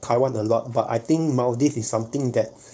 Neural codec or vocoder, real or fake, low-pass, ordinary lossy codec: codec, 16 kHz, 4.8 kbps, FACodec; fake; none; none